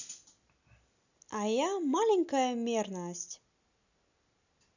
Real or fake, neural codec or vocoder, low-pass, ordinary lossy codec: real; none; 7.2 kHz; none